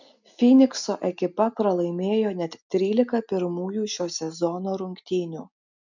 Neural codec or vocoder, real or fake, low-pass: none; real; 7.2 kHz